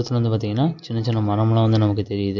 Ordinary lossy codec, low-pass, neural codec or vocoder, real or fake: none; 7.2 kHz; none; real